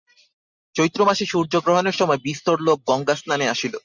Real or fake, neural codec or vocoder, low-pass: real; none; 7.2 kHz